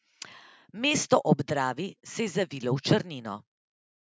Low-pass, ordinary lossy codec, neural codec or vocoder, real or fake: none; none; none; real